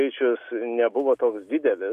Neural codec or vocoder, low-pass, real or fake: none; 14.4 kHz; real